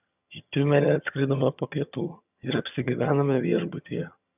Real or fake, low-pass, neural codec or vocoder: fake; 3.6 kHz; vocoder, 22.05 kHz, 80 mel bands, HiFi-GAN